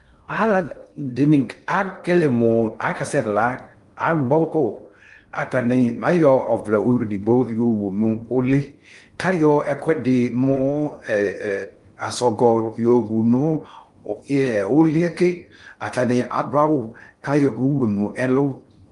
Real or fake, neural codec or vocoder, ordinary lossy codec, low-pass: fake; codec, 16 kHz in and 24 kHz out, 0.6 kbps, FocalCodec, streaming, 2048 codes; Opus, 32 kbps; 10.8 kHz